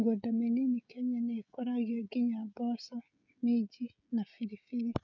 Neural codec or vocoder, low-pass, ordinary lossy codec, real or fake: codec, 16 kHz, 16 kbps, FreqCodec, smaller model; 7.2 kHz; none; fake